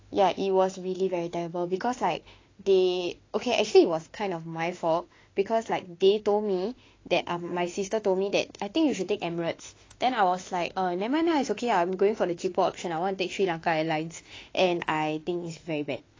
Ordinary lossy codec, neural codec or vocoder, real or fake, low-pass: AAC, 32 kbps; autoencoder, 48 kHz, 32 numbers a frame, DAC-VAE, trained on Japanese speech; fake; 7.2 kHz